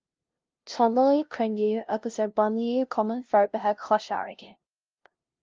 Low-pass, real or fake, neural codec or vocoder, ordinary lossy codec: 7.2 kHz; fake; codec, 16 kHz, 0.5 kbps, FunCodec, trained on LibriTTS, 25 frames a second; Opus, 24 kbps